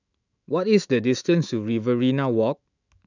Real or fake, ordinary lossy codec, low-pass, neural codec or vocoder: fake; none; 7.2 kHz; autoencoder, 48 kHz, 128 numbers a frame, DAC-VAE, trained on Japanese speech